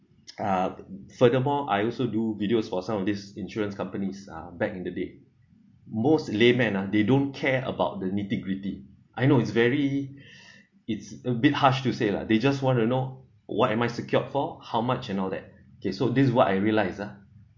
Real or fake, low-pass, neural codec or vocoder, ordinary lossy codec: real; 7.2 kHz; none; MP3, 48 kbps